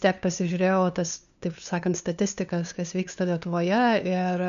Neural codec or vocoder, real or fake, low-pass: codec, 16 kHz, 2 kbps, FunCodec, trained on LibriTTS, 25 frames a second; fake; 7.2 kHz